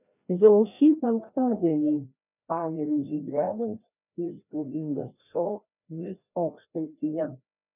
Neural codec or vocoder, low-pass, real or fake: codec, 16 kHz, 1 kbps, FreqCodec, larger model; 3.6 kHz; fake